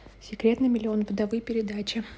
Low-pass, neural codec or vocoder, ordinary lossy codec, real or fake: none; none; none; real